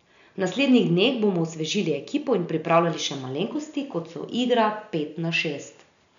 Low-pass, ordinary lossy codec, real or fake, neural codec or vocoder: 7.2 kHz; none; real; none